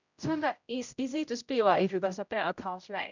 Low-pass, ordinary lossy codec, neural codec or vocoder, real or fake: 7.2 kHz; none; codec, 16 kHz, 0.5 kbps, X-Codec, HuBERT features, trained on general audio; fake